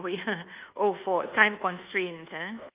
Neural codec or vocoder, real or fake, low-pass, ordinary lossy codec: codec, 24 kHz, 1.2 kbps, DualCodec; fake; 3.6 kHz; Opus, 24 kbps